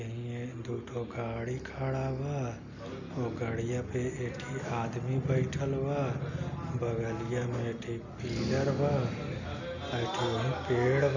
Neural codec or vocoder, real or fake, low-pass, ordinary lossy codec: none; real; 7.2 kHz; none